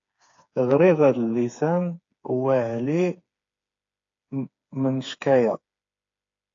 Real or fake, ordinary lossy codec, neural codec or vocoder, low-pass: fake; AAC, 48 kbps; codec, 16 kHz, 4 kbps, FreqCodec, smaller model; 7.2 kHz